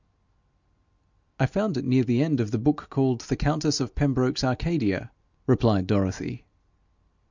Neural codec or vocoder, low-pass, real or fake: none; 7.2 kHz; real